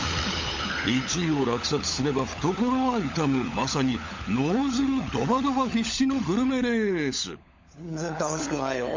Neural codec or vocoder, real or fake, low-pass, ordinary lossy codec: codec, 16 kHz, 16 kbps, FunCodec, trained on LibriTTS, 50 frames a second; fake; 7.2 kHz; MP3, 48 kbps